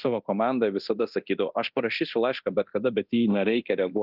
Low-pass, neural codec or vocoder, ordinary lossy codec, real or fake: 5.4 kHz; codec, 24 kHz, 0.9 kbps, DualCodec; Opus, 24 kbps; fake